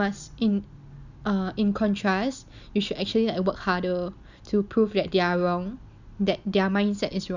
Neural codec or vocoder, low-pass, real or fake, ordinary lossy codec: none; 7.2 kHz; real; none